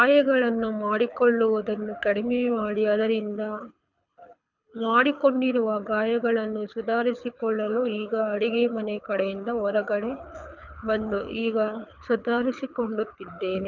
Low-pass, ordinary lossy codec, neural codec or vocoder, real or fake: 7.2 kHz; none; codec, 24 kHz, 6 kbps, HILCodec; fake